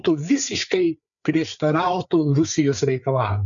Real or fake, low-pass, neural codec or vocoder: fake; 7.2 kHz; codec, 16 kHz, 4 kbps, FreqCodec, larger model